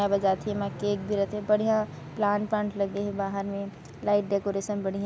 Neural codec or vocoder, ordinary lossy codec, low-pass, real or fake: none; none; none; real